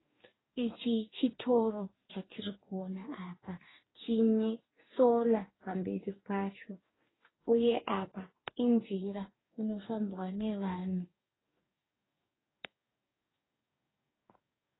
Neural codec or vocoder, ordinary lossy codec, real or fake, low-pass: codec, 44.1 kHz, 2.6 kbps, DAC; AAC, 16 kbps; fake; 7.2 kHz